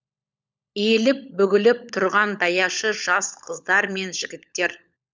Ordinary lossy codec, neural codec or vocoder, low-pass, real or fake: none; codec, 16 kHz, 16 kbps, FunCodec, trained on LibriTTS, 50 frames a second; none; fake